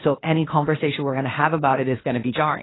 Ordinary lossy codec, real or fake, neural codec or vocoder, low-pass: AAC, 16 kbps; fake; codec, 16 kHz, 0.8 kbps, ZipCodec; 7.2 kHz